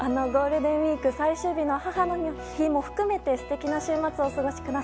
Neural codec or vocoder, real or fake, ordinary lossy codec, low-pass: none; real; none; none